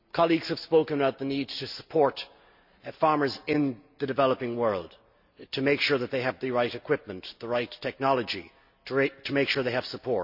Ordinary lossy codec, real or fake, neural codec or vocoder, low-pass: none; real; none; 5.4 kHz